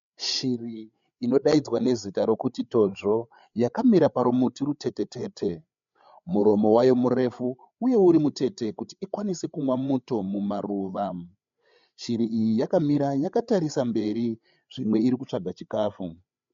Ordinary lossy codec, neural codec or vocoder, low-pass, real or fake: MP3, 48 kbps; codec, 16 kHz, 8 kbps, FreqCodec, larger model; 7.2 kHz; fake